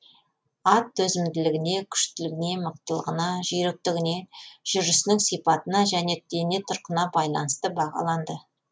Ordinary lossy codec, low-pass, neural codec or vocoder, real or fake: none; none; none; real